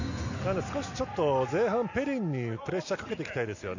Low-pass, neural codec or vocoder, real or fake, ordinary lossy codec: 7.2 kHz; none; real; none